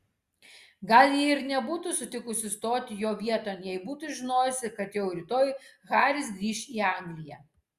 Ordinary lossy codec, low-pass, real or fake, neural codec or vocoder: Opus, 64 kbps; 14.4 kHz; real; none